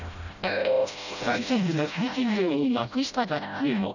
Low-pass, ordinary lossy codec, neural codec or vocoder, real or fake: 7.2 kHz; none; codec, 16 kHz, 0.5 kbps, FreqCodec, smaller model; fake